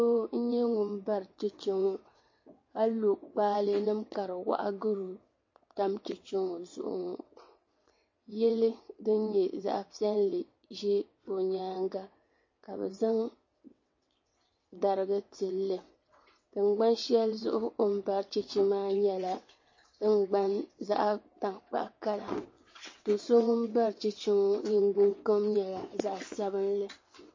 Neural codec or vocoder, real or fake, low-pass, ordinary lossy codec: vocoder, 22.05 kHz, 80 mel bands, WaveNeXt; fake; 7.2 kHz; MP3, 32 kbps